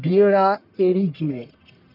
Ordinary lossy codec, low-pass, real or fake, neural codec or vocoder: none; 5.4 kHz; fake; codec, 44.1 kHz, 1.7 kbps, Pupu-Codec